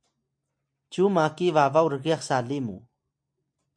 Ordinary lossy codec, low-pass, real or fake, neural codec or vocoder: MP3, 64 kbps; 9.9 kHz; real; none